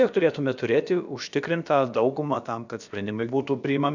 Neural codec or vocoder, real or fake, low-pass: codec, 16 kHz, about 1 kbps, DyCAST, with the encoder's durations; fake; 7.2 kHz